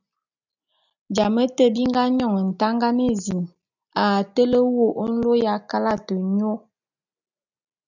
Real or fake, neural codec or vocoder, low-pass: real; none; 7.2 kHz